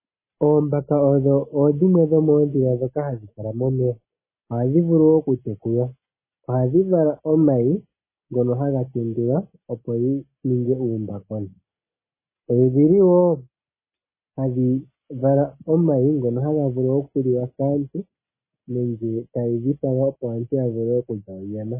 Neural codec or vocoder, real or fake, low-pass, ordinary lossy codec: none; real; 3.6 kHz; MP3, 16 kbps